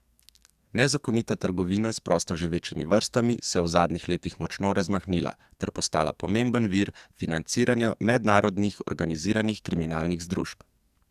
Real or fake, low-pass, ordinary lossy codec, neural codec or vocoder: fake; 14.4 kHz; Opus, 64 kbps; codec, 44.1 kHz, 2.6 kbps, SNAC